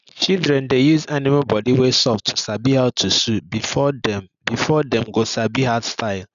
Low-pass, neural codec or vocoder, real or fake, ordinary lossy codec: 7.2 kHz; none; real; none